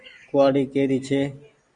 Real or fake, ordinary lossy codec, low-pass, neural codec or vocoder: real; Opus, 64 kbps; 9.9 kHz; none